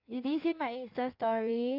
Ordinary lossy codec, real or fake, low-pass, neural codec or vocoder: MP3, 48 kbps; fake; 5.4 kHz; codec, 16 kHz in and 24 kHz out, 1.1 kbps, FireRedTTS-2 codec